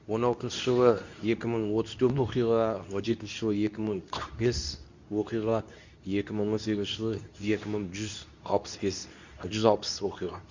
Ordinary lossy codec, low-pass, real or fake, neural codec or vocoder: Opus, 64 kbps; 7.2 kHz; fake; codec, 24 kHz, 0.9 kbps, WavTokenizer, medium speech release version 2